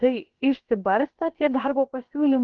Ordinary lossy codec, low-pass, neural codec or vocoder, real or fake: Opus, 16 kbps; 7.2 kHz; codec, 16 kHz, about 1 kbps, DyCAST, with the encoder's durations; fake